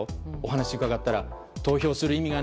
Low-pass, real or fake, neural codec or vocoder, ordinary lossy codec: none; real; none; none